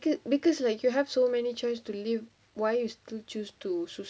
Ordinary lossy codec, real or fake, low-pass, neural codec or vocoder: none; real; none; none